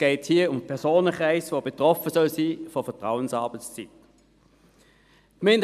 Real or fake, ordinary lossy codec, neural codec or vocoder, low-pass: real; none; none; 14.4 kHz